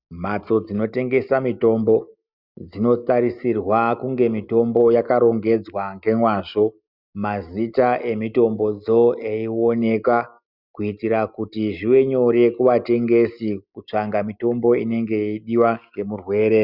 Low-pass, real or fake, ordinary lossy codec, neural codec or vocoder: 5.4 kHz; real; Opus, 64 kbps; none